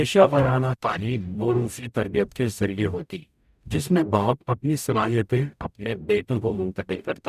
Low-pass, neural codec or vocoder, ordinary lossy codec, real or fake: 14.4 kHz; codec, 44.1 kHz, 0.9 kbps, DAC; none; fake